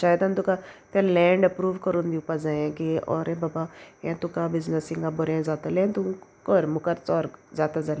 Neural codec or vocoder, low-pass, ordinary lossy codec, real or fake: none; none; none; real